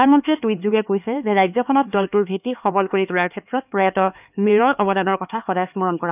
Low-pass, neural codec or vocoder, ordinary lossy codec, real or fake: 3.6 kHz; codec, 16 kHz, 4 kbps, X-Codec, HuBERT features, trained on LibriSpeech; none; fake